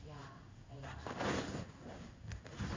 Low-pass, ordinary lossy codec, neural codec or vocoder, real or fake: 7.2 kHz; MP3, 48 kbps; codec, 16 kHz in and 24 kHz out, 1 kbps, XY-Tokenizer; fake